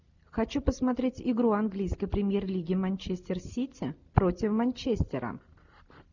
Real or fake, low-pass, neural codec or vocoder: real; 7.2 kHz; none